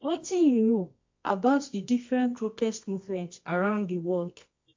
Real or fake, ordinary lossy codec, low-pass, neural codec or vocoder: fake; MP3, 48 kbps; 7.2 kHz; codec, 24 kHz, 0.9 kbps, WavTokenizer, medium music audio release